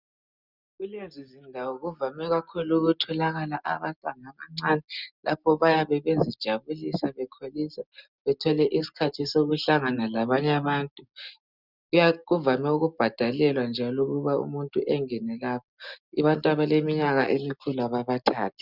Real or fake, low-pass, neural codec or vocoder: real; 5.4 kHz; none